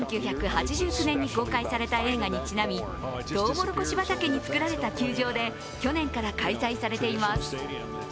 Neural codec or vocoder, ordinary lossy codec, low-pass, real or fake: none; none; none; real